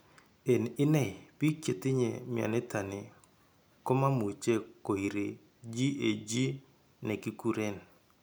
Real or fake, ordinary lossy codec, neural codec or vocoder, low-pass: real; none; none; none